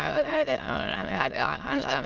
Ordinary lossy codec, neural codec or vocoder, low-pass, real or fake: Opus, 32 kbps; autoencoder, 22.05 kHz, a latent of 192 numbers a frame, VITS, trained on many speakers; 7.2 kHz; fake